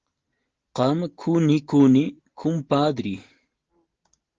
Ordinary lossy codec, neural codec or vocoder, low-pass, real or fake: Opus, 16 kbps; none; 7.2 kHz; real